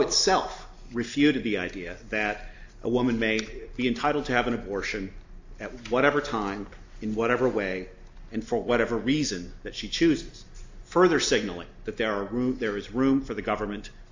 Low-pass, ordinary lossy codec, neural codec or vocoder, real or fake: 7.2 kHz; AAC, 48 kbps; none; real